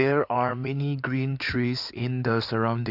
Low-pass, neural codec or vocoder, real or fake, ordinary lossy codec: 5.4 kHz; codec, 16 kHz in and 24 kHz out, 2.2 kbps, FireRedTTS-2 codec; fake; MP3, 48 kbps